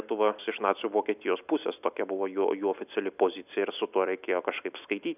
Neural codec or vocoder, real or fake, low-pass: none; real; 3.6 kHz